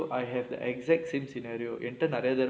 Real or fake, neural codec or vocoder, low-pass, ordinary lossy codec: real; none; none; none